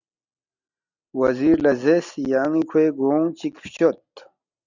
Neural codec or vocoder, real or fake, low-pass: none; real; 7.2 kHz